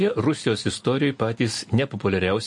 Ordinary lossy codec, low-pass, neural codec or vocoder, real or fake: MP3, 48 kbps; 10.8 kHz; none; real